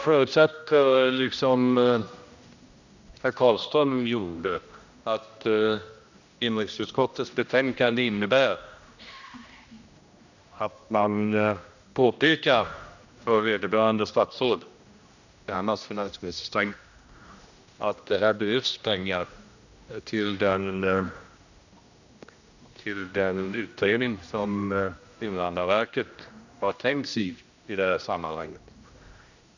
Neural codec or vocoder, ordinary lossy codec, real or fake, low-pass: codec, 16 kHz, 1 kbps, X-Codec, HuBERT features, trained on general audio; none; fake; 7.2 kHz